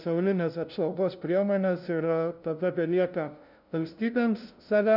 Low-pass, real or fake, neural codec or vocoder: 5.4 kHz; fake; codec, 16 kHz, 0.5 kbps, FunCodec, trained on LibriTTS, 25 frames a second